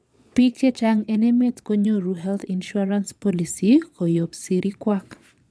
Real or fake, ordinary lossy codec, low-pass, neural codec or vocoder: fake; none; none; vocoder, 22.05 kHz, 80 mel bands, Vocos